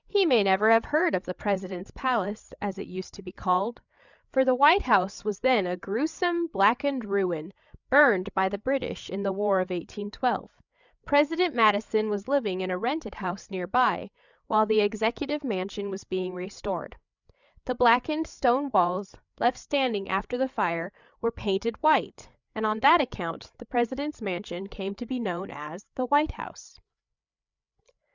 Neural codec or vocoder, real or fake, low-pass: codec, 16 kHz, 8 kbps, FreqCodec, larger model; fake; 7.2 kHz